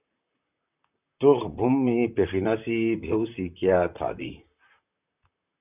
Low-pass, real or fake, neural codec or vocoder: 3.6 kHz; fake; vocoder, 44.1 kHz, 128 mel bands, Pupu-Vocoder